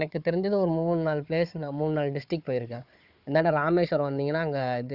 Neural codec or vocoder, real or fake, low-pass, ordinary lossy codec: codec, 16 kHz, 4 kbps, FunCodec, trained on Chinese and English, 50 frames a second; fake; 5.4 kHz; Opus, 64 kbps